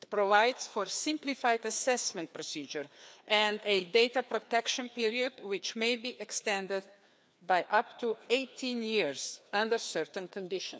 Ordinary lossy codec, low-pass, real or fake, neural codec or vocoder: none; none; fake; codec, 16 kHz, 2 kbps, FreqCodec, larger model